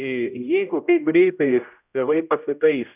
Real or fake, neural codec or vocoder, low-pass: fake; codec, 16 kHz, 0.5 kbps, X-Codec, HuBERT features, trained on general audio; 3.6 kHz